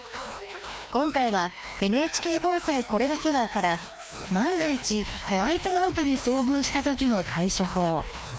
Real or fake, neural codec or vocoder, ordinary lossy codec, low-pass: fake; codec, 16 kHz, 1 kbps, FreqCodec, larger model; none; none